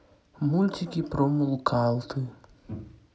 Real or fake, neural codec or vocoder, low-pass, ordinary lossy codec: real; none; none; none